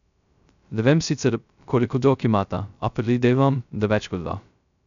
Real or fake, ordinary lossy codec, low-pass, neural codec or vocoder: fake; none; 7.2 kHz; codec, 16 kHz, 0.2 kbps, FocalCodec